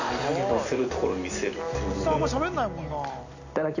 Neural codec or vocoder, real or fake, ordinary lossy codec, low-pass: none; real; none; 7.2 kHz